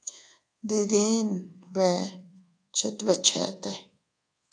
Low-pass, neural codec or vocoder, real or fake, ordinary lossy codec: 9.9 kHz; codec, 24 kHz, 1.2 kbps, DualCodec; fake; AAC, 48 kbps